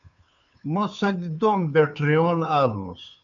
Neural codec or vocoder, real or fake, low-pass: codec, 16 kHz, 2 kbps, FunCodec, trained on Chinese and English, 25 frames a second; fake; 7.2 kHz